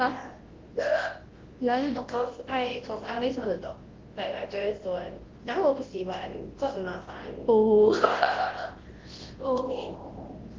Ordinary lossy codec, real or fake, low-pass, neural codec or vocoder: Opus, 16 kbps; fake; 7.2 kHz; codec, 24 kHz, 0.9 kbps, WavTokenizer, large speech release